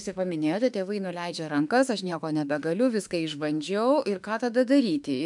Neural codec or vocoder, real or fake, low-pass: autoencoder, 48 kHz, 32 numbers a frame, DAC-VAE, trained on Japanese speech; fake; 10.8 kHz